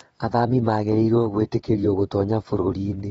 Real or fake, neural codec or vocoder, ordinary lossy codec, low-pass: fake; vocoder, 22.05 kHz, 80 mel bands, WaveNeXt; AAC, 24 kbps; 9.9 kHz